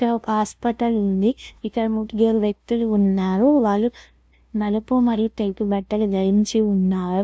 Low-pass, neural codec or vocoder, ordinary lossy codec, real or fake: none; codec, 16 kHz, 0.5 kbps, FunCodec, trained on LibriTTS, 25 frames a second; none; fake